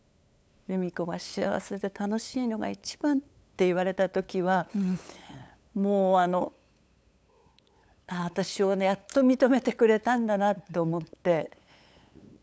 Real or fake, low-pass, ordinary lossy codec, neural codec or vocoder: fake; none; none; codec, 16 kHz, 8 kbps, FunCodec, trained on LibriTTS, 25 frames a second